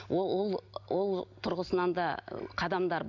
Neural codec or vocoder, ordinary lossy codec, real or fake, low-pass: none; none; real; 7.2 kHz